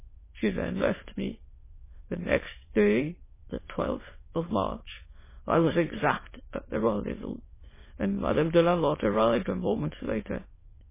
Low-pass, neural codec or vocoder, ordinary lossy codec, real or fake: 3.6 kHz; autoencoder, 22.05 kHz, a latent of 192 numbers a frame, VITS, trained on many speakers; MP3, 16 kbps; fake